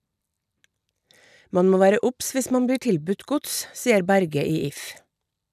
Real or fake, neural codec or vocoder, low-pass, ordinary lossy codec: real; none; 14.4 kHz; none